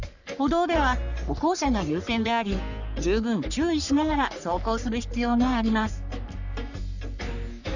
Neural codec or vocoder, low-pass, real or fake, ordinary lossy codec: codec, 44.1 kHz, 3.4 kbps, Pupu-Codec; 7.2 kHz; fake; none